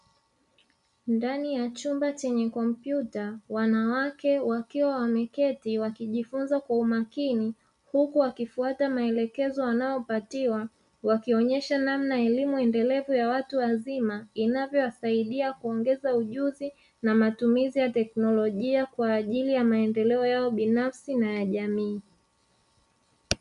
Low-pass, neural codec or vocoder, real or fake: 10.8 kHz; none; real